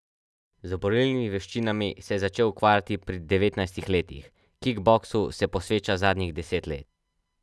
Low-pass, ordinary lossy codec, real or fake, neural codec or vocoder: none; none; real; none